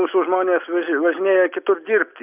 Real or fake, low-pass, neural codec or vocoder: real; 3.6 kHz; none